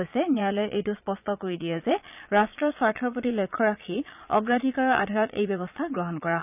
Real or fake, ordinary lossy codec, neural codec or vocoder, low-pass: fake; none; vocoder, 22.05 kHz, 80 mel bands, Vocos; 3.6 kHz